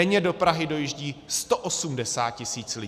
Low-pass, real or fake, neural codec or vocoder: 14.4 kHz; real; none